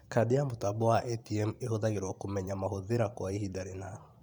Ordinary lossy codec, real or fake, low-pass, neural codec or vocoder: none; fake; 19.8 kHz; vocoder, 44.1 kHz, 128 mel bands every 512 samples, BigVGAN v2